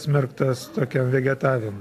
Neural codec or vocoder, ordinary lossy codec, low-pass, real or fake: none; AAC, 64 kbps; 14.4 kHz; real